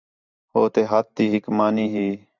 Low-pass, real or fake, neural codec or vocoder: 7.2 kHz; fake; vocoder, 44.1 kHz, 128 mel bands every 512 samples, BigVGAN v2